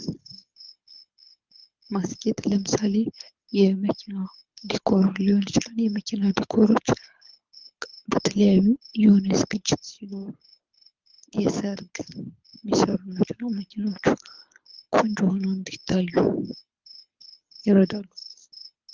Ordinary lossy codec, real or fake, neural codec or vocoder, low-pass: Opus, 32 kbps; fake; codec, 24 kHz, 6 kbps, HILCodec; 7.2 kHz